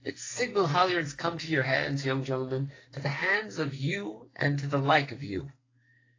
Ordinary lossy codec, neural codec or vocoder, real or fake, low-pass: AAC, 32 kbps; codec, 44.1 kHz, 2.6 kbps, SNAC; fake; 7.2 kHz